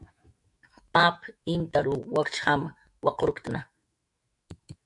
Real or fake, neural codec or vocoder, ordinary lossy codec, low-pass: fake; autoencoder, 48 kHz, 128 numbers a frame, DAC-VAE, trained on Japanese speech; MP3, 64 kbps; 10.8 kHz